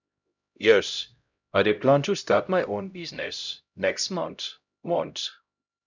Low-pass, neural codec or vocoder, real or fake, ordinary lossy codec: 7.2 kHz; codec, 16 kHz, 0.5 kbps, X-Codec, HuBERT features, trained on LibriSpeech; fake; none